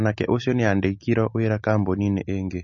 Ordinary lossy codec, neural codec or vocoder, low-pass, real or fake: MP3, 32 kbps; none; 7.2 kHz; real